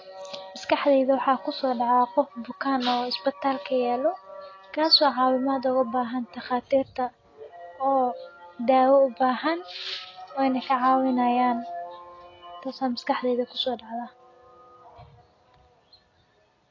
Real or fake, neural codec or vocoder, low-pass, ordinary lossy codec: real; none; 7.2 kHz; AAC, 32 kbps